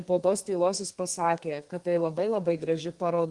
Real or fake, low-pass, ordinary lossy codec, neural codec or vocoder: fake; 10.8 kHz; Opus, 24 kbps; codec, 32 kHz, 1.9 kbps, SNAC